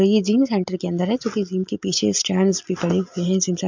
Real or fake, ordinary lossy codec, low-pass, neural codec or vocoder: real; MP3, 64 kbps; 7.2 kHz; none